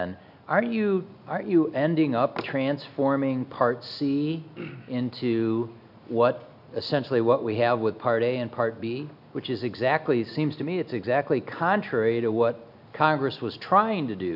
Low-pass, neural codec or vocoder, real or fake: 5.4 kHz; none; real